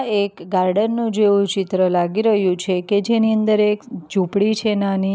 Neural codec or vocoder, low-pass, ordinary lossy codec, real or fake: none; none; none; real